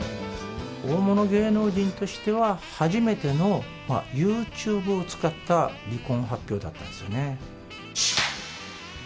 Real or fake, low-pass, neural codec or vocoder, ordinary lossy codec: real; none; none; none